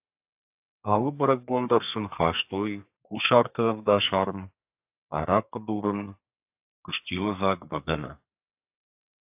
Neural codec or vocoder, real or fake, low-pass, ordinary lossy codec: codec, 32 kHz, 1.9 kbps, SNAC; fake; 3.6 kHz; AAC, 32 kbps